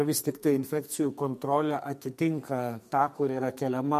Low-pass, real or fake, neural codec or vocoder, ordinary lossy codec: 14.4 kHz; fake; codec, 44.1 kHz, 2.6 kbps, SNAC; MP3, 64 kbps